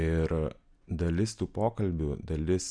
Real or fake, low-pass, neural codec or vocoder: real; 9.9 kHz; none